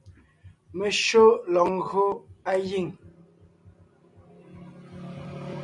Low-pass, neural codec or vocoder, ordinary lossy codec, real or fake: 10.8 kHz; none; MP3, 96 kbps; real